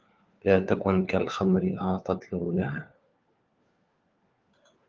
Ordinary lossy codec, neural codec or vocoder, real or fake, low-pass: Opus, 32 kbps; codec, 16 kHz, 4 kbps, FunCodec, trained on LibriTTS, 50 frames a second; fake; 7.2 kHz